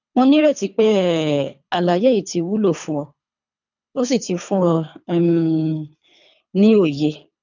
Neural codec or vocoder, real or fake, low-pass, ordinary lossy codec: codec, 24 kHz, 3 kbps, HILCodec; fake; 7.2 kHz; none